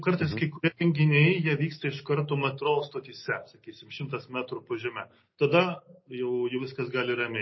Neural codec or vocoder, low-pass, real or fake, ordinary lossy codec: none; 7.2 kHz; real; MP3, 24 kbps